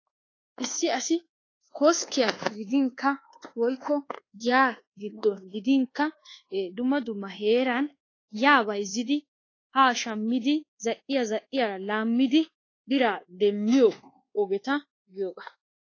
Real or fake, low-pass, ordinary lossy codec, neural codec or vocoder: fake; 7.2 kHz; AAC, 32 kbps; codec, 24 kHz, 1.2 kbps, DualCodec